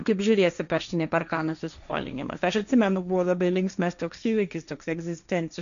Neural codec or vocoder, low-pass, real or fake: codec, 16 kHz, 1.1 kbps, Voila-Tokenizer; 7.2 kHz; fake